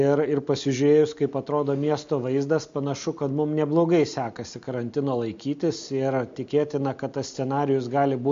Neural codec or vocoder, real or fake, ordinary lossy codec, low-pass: none; real; MP3, 48 kbps; 7.2 kHz